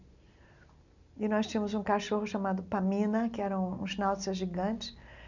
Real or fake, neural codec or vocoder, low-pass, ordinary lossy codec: real; none; 7.2 kHz; none